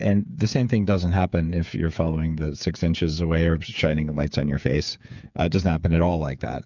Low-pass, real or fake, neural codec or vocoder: 7.2 kHz; fake; codec, 16 kHz, 8 kbps, FreqCodec, smaller model